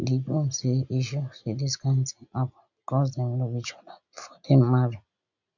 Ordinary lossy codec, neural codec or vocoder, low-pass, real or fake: none; none; 7.2 kHz; real